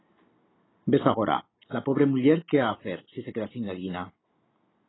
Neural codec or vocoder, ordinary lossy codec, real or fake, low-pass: none; AAC, 16 kbps; real; 7.2 kHz